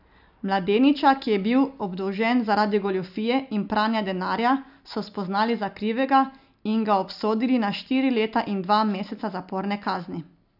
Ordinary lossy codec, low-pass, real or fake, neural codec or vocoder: none; 5.4 kHz; real; none